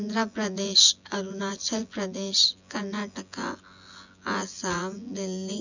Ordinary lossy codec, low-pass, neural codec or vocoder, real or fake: none; 7.2 kHz; vocoder, 24 kHz, 100 mel bands, Vocos; fake